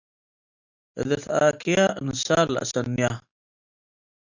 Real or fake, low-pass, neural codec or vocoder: real; 7.2 kHz; none